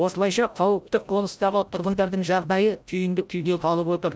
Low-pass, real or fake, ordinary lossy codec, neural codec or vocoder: none; fake; none; codec, 16 kHz, 0.5 kbps, FreqCodec, larger model